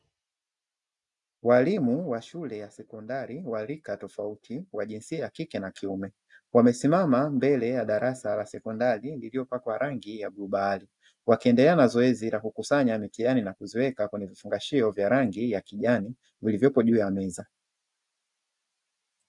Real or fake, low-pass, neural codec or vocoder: real; 10.8 kHz; none